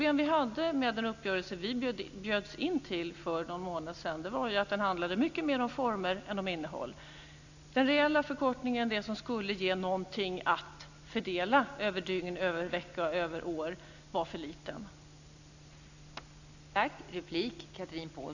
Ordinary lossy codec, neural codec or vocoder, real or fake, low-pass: none; none; real; 7.2 kHz